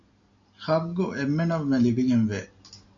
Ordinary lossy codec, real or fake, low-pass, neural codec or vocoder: AAC, 48 kbps; real; 7.2 kHz; none